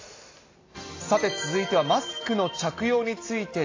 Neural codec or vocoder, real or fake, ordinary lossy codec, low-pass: none; real; AAC, 32 kbps; 7.2 kHz